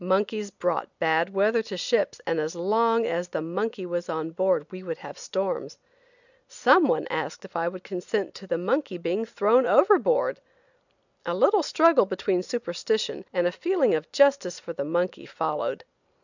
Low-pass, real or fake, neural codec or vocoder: 7.2 kHz; real; none